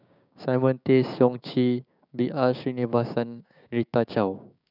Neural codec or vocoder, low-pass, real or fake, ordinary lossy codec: codec, 16 kHz, 6 kbps, DAC; 5.4 kHz; fake; none